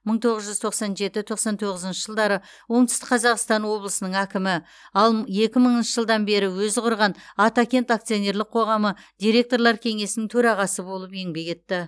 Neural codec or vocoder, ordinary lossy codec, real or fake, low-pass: none; none; real; none